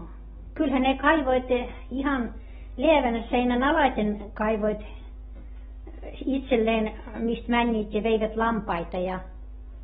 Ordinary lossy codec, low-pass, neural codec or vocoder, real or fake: AAC, 16 kbps; 19.8 kHz; none; real